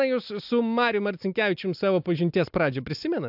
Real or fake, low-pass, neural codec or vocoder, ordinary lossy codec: real; 5.4 kHz; none; AAC, 48 kbps